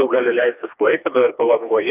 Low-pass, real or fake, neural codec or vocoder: 3.6 kHz; fake; codec, 16 kHz, 2 kbps, FreqCodec, smaller model